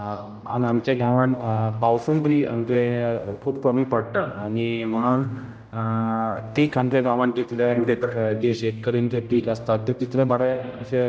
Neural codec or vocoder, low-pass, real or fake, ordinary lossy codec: codec, 16 kHz, 0.5 kbps, X-Codec, HuBERT features, trained on general audio; none; fake; none